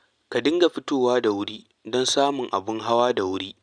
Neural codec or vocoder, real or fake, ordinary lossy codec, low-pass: none; real; none; 9.9 kHz